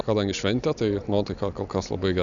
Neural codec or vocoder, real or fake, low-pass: none; real; 7.2 kHz